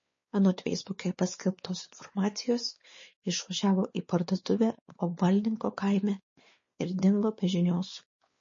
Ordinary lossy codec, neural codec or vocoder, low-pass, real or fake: MP3, 32 kbps; codec, 16 kHz, 4 kbps, X-Codec, WavLM features, trained on Multilingual LibriSpeech; 7.2 kHz; fake